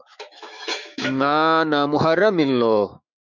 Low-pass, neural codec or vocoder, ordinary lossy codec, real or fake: 7.2 kHz; autoencoder, 48 kHz, 128 numbers a frame, DAC-VAE, trained on Japanese speech; MP3, 64 kbps; fake